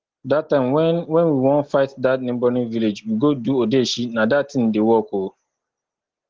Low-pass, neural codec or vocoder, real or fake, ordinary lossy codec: 7.2 kHz; none; real; Opus, 16 kbps